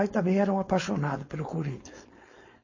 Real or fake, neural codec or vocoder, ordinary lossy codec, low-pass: fake; codec, 16 kHz, 4.8 kbps, FACodec; MP3, 32 kbps; 7.2 kHz